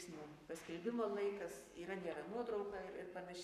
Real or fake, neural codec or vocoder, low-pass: fake; codec, 44.1 kHz, 7.8 kbps, Pupu-Codec; 14.4 kHz